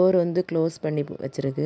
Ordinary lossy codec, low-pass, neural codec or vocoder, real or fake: none; none; none; real